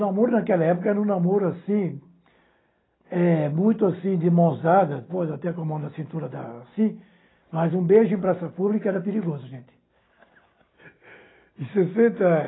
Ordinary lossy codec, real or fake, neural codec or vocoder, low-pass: AAC, 16 kbps; real; none; 7.2 kHz